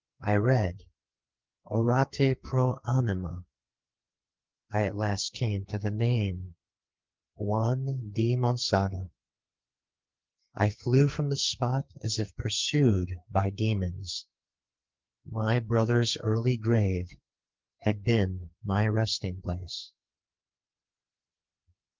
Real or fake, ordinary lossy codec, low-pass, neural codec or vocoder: fake; Opus, 32 kbps; 7.2 kHz; codec, 44.1 kHz, 2.6 kbps, SNAC